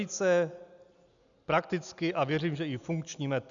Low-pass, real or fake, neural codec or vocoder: 7.2 kHz; real; none